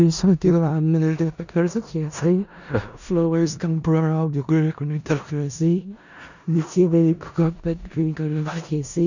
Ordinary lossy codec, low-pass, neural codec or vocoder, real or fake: none; 7.2 kHz; codec, 16 kHz in and 24 kHz out, 0.4 kbps, LongCat-Audio-Codec, four codebook decoder; fake